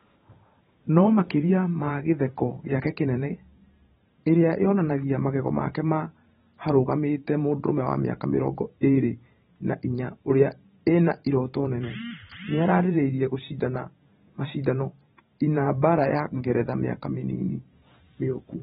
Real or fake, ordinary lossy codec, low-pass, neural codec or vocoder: fake; AAC, 16 kbps; 19.8 kHz; vocoder, 44.1 kHz, 128 mel bands every 512 samples, BigVGAN v2